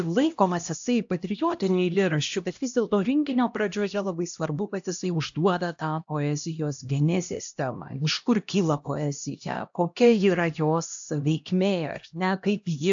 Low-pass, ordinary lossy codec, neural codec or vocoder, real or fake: 7.2 kHz; AAC, 64 kbps; codec, 16 kHz, 1 kbps, X-Codec, HuBERT features, trained on LibriSpeech; fake